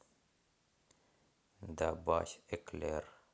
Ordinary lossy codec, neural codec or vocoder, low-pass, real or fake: none; none; none; real